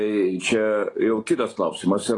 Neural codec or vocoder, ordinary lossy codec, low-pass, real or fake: none; AAC, 32 kbps; 10.8 kHz; real